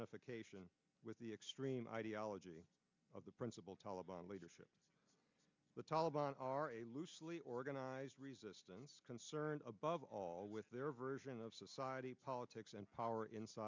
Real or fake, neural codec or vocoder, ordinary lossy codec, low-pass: real; none; MP3, 64 kbps; 7.2 kHz